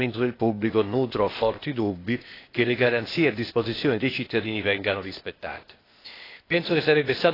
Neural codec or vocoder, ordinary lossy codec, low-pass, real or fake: codec, 16 kHz, 0.8 kbps, ZipCodec; AAC, 24 kbps; 5.4 kHz; fake